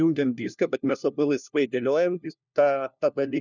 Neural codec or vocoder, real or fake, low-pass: codec, 16 kHz, 1 kbps, FunCodec, trained on LibriTTS, 50 frames a second; fake; 7.2 kHz